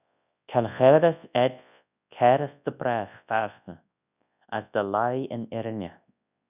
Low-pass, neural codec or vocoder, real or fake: 3.6 kHz; codec, 24 kHz, 0.9 kbps, WavTokenizer, large speech release; fake